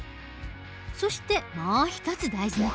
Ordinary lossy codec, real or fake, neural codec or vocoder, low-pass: none; real; none; none